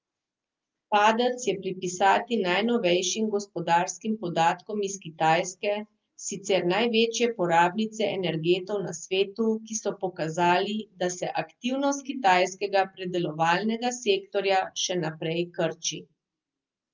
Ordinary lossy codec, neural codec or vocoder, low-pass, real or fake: Opus, 24 kbps; none; 7.2 kHz; real